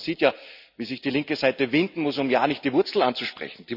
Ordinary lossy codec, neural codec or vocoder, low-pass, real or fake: none; none; 5.4 kHz; real